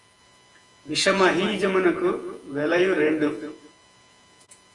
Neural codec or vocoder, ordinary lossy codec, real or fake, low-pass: vocoder, 48 kHz, 128 mel bands, Vocos; Opus, 32 kbps; fake; 10.8 kHz